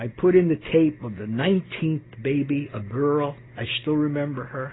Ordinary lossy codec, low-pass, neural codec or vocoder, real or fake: AAC, 16 kbps; 7.2 kHz; none; real